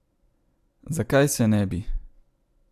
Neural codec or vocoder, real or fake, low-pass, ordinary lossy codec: none; real; 14.4 kHz; AAC, 96 kbps